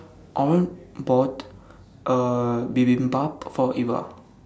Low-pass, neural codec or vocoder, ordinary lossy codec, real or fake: none; none; none; real